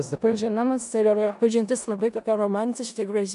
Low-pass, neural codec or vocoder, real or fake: 10.8 kHz; codec, 16 kHz in and 24 kHz out, 0.4 kbps, LongCat-Audio-Codec, four codebook decoder; fake